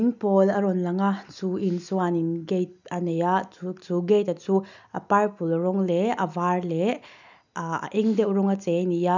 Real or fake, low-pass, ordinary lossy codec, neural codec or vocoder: real; 7.2 kHz; none; none